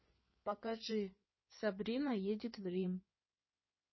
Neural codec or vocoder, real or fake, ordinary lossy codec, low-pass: codec, 16 kHz in and 24 kHz out, 2.2 kbps, FireRedTTS-2 codec; fake; MP3, 24 kbps; 7.2 kHz